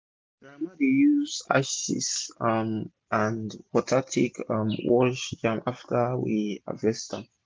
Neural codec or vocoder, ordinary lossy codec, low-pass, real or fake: none; none; none; real